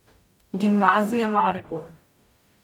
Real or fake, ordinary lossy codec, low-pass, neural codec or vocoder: fake; none; 19.8 kHz; codec, 44.1 kHz, 0.9 kbps, DAC